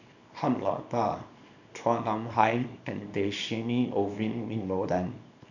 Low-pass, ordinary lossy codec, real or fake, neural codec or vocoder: 7.2 kHz; none; fake; codec, 24 kHz, 0.9 kbps, WavTokenizer, small release